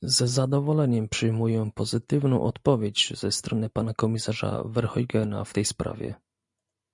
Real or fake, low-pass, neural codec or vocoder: real; 10.8 kHz; none